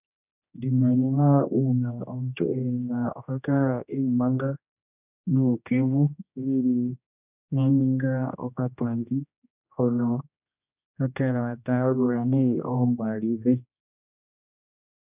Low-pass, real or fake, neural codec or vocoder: 3.6 kHz; fake; codec, 16 kHz, 1 kbps, X-Codec, HuBERT features, trained on general audio